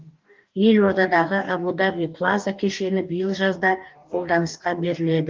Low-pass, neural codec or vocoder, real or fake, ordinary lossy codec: 7.2 kHz; codec, 44.1 kHz, 2.6 kbps, DAC; fake; Opus, 24 kbps